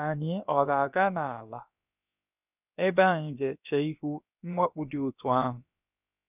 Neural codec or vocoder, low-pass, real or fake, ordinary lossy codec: codec, 16 kHz, about 1 kbps, DyCAST, with the encoder's durations; 3.6 kHz; fake; none